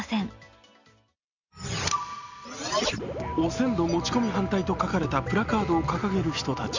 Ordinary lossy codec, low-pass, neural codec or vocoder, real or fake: Opus, 64 kbps; 7.2 kHz; none; real